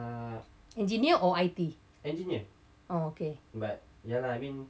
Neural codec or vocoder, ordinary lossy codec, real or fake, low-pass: none; none; real; none